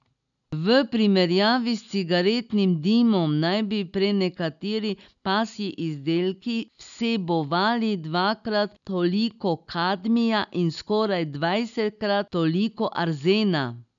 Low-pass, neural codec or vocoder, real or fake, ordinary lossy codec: 7.2 kHz; none; real; none